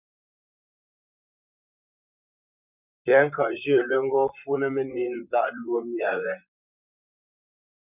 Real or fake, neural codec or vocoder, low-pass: fake; vocoder, 44.1 kHz, 128 mel bands, Pupu-Vocoder; 3.6 kHz